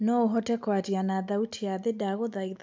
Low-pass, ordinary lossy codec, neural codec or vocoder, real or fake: none; none; none; real